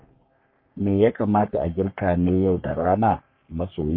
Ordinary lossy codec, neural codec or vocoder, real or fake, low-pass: MP3, 32 kbps; codec, 44.1 kHz, 3.4 kbps, Pupu-Codec; fake; 5.4 kHz